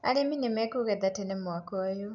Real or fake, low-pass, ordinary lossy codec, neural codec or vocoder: real; 7.2 kHz; none; none